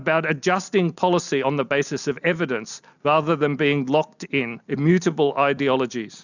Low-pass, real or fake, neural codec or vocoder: 7.2 kHz; real; none